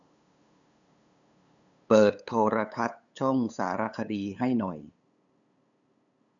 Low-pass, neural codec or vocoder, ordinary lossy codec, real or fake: 7.2 kHz; codec, 16 kHz, 8 kbps, FunCodec, trained on LibriTTS, 25 frames a second; none; fake